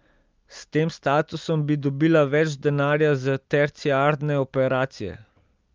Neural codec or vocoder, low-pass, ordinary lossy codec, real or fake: none; 7.2 kHz; Opus, 24 kbps; real